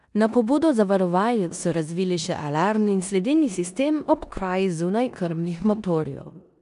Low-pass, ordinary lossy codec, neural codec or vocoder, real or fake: 10.8 kHz; none; codec, 16 kHz in and 24 kHz out, 0.9 kbps, LongCat-Audio-Codec, four codebook decoder; fake